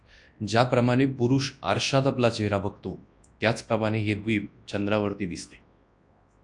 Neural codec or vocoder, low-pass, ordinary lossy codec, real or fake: codec, 24 kHz, 0.9 kbps, WavTokenizer, large speech release; 10.8 kHz; AAC, 64 kbps; fake